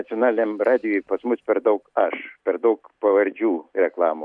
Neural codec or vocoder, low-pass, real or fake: none; 9.9 kHz; real